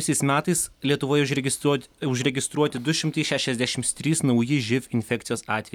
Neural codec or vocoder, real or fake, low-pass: none; real; 19.8 kHz